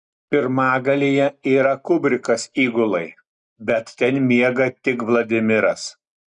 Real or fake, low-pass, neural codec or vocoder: real; 9.9 kHz; none